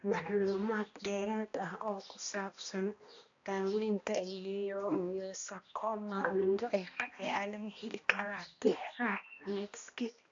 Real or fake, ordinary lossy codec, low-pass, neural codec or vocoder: fake; MP3, 64 kbps; 7.2 kHz; codec, 16 kHz, 1 kbps, X-Codec, HuBERT features, trained on balanced general audio